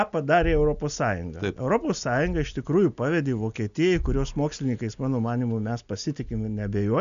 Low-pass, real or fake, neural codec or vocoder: 7.2 kHz; real; none